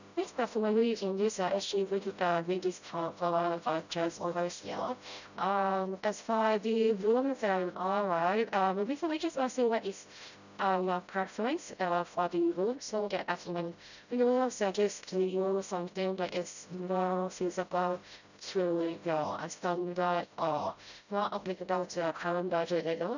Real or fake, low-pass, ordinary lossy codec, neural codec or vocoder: fake; 7.2 kHz; none; codec, 16 kHz, 0.5 kbps, FreqCodec, smaller model